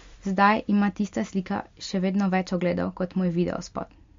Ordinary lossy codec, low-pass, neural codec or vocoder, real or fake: MP3, 48 kbps; 7.2 kHz; none; real